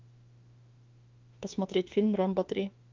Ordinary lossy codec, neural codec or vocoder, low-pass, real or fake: Opus, 32 kbps; autoencoder, 48 kHz, 32 numbers a frame, DAC-VAE, trained on Japanese speech; 7.2 kHz; fake